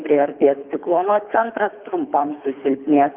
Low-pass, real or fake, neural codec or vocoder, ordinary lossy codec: 3.6 kHz; fake; codec, 24 kHz, 3 kbps, HILCodec; Opus, 24 kbps